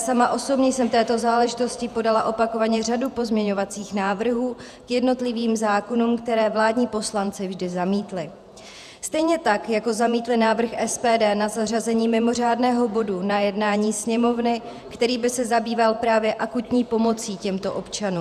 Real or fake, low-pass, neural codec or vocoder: fake; 14.4 kHz; vocoder, 48 kHz, 128 mel bands, Vocos